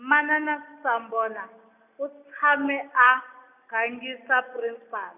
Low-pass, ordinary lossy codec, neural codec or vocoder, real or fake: 3.6 kHz; none; none; real